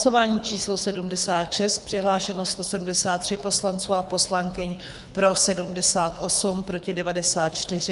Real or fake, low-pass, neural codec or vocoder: fake; 10.8 kHz; codec, 24 kHz, 3 kbps, HILCodec